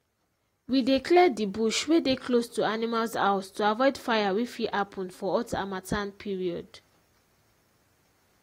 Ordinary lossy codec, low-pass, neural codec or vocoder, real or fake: AAC, 48 kbps; 19.8 kHz; none; real